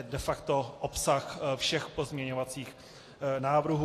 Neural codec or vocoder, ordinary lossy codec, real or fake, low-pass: none; AAC, 48 kbps; real; 14.4 kHz